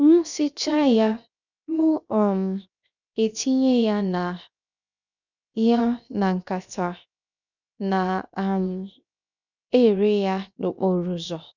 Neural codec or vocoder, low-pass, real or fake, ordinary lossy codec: codec, 16 kHz, 0.7 kbps, FocalCodec; 7.2 kHz; fake; none